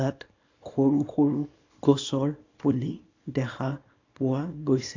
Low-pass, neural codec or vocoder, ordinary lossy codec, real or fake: 7.2 kHz; codec, 24 kHz, 0.9 kbps, WavTokenizer, small release; AAC, 32 kbps; fake